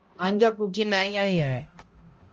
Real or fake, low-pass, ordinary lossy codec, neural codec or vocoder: fake; 7.2 kHz; Opus, 24 kbps; codec, 16 kHz, 0.5 kbps, X-Codec, HuBERT features, trained on balanced general audio